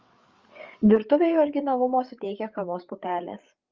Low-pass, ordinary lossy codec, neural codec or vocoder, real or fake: 7.2 kHz; Opus, 32 kbps; codec, 16 kHz, 8 kbps, FreqCodec, larger model; fake